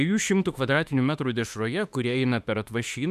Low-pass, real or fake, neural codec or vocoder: 14.4 kHz; fake; autoencoder, 48 kHz, 32 numbers a frame, DAC-VAE, trained on Japanese speech